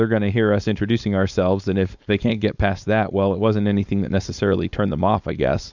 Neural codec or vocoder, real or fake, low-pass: codec, 16 kHz, 4.8 kbps, FACodec; fake; 7.2 kHz